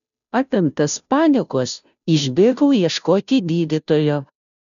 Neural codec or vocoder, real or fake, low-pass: codec, 16 kHz, 0.5 kbps, FunCodec, trained on Chinese and English, 25 frames a second; fake; 7.2 kHz